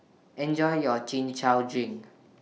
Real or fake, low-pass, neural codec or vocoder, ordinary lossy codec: real; none; none; none